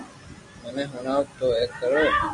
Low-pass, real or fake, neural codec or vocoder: 10.8 kHz; real; none